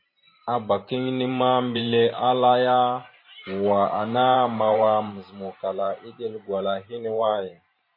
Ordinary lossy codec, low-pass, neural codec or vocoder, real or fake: MP3, 24 kbps; 5.4 kHz; vocoder, 44.1 kHz, 128 mel bands every 512 samples, BigVGAN v2; fake